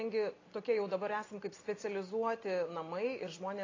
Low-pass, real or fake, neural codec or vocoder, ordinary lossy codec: 7.2 kHz; real; none; AAC, 32 kbps